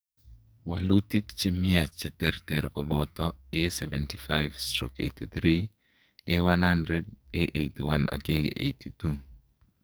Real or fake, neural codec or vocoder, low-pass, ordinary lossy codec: fake; codec, 44.1 kHz, 2.6 kbps, SNAC; none; none